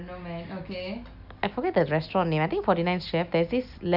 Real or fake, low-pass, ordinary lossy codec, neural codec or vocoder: real; 5.4 kHz; none; none